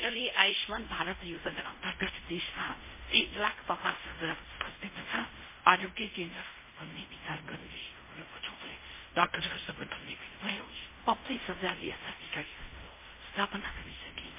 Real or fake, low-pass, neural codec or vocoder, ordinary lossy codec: fake; 3.6 kHz; codec, 16 kHz in and 24 kHz out, 0.4 kbps, LongCat-Audio-Codec, fine tuned four codebook decoder; MP3, 16 kbps